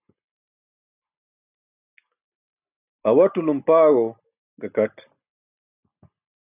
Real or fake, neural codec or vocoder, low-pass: real; none; 3.6 kHz